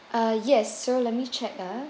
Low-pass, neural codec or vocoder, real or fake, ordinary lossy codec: none; none; real; none